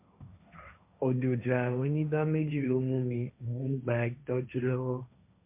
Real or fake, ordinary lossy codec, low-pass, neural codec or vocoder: fake; MP3, 32 kbps; 3.6 kHz; codec, 16 kHz, 1.1 kbps, Voila-Tokenizer